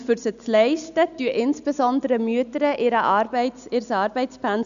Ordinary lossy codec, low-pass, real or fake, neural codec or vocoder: none; 7.2 kHz; real; none